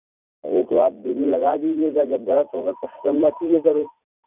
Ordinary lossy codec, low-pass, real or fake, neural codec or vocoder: none; 3.6 kHz; fake; vocoder, 44.1 kHz, 80 mel bands, Vocos